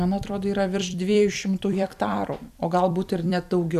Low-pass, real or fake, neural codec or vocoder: 14.4 kHz; fake; vocoder, 44.1 kHz, 128 mel bands every 256 samples, BigVGAN v2